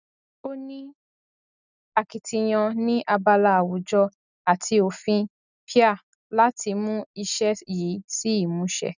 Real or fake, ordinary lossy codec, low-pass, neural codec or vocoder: real; none; 7.2 kHz; none